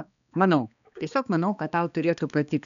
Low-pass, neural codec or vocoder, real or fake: 7.2 kHz; codec, 16 kHz, 2 kbps, X-Codec, HuBERT features, trained on balanced general audio; fake